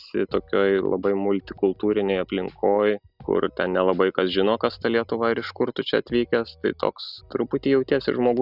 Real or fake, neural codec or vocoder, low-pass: real; none; 5.4 kHz